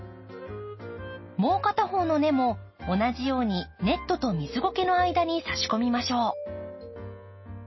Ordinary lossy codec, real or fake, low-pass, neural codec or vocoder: MP3, 24 kbps; real; 7.2 kHz; none